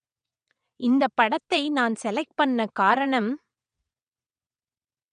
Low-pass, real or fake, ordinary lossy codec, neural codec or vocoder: 9.9 kHz; fake; none; vocoder, 22.05 kHz, 80 mel bands, WaveNeXt